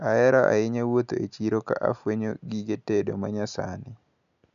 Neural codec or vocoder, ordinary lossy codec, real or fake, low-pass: none; none; real; 7.2 kHz